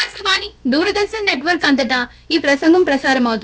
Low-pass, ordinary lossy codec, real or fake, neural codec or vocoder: none; none; fake; codec, 16 kHz, about 1 kbps, DyCAST, with the encoder's durations